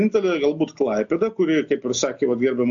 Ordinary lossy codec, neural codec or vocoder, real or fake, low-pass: AAC, 64 kbps; none; real; 7.2 kHz